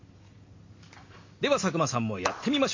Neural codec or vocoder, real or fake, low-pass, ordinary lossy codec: none; real; 7.2 kHz; MP3, 32 kbps